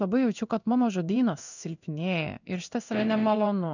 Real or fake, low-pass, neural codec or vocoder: fake; 7.2 kHz; codec, 16 kHz in and 24 kHz out, 1 kbps, XY-Tokenizer